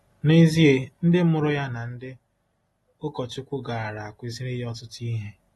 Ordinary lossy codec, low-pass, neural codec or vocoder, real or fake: AAC, 32 kbps; 19.8 kHz; none; real